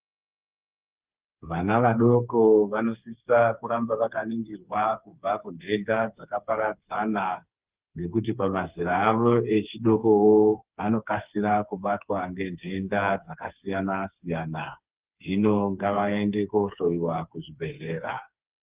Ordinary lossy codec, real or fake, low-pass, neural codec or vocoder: Opus, 24 kbps; fake; 3.6 kHz; codec, 16 kHz, 4 kbps, FreqCodec, smaller model